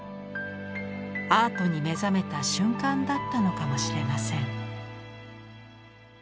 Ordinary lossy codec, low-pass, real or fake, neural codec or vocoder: none; none; real; none